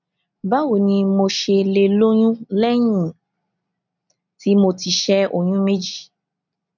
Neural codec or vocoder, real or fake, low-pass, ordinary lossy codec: none; real; 7.2 kHz; none